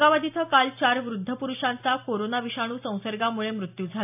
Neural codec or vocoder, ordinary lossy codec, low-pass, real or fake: none; AAC, 32 kbps; 3.6 kHz; real